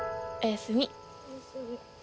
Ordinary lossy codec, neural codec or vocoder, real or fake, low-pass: none; none; real; none